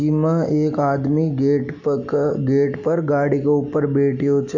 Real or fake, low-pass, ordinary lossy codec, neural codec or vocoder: real; 7.2 kHz; none; none